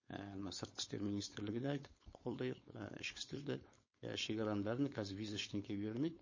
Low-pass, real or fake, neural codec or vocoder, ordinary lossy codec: 7.2 kHz; fake; codec, 16 kHz, 4.8 kbps, FACodec; MP3, 32 kbps